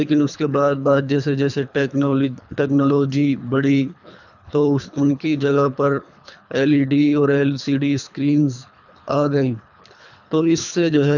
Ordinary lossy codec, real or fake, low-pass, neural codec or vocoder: none; fake; 7.2 kHz; codec, 24 kHz, 3 kbps, HILCodec